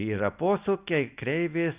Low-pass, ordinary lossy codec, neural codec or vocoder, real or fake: 3.6 kHz; Opus, 64 kbps; none; real